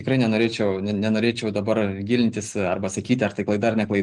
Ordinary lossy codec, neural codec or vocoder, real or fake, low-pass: Opus, 24 kbps; none; real; 10.8 kHz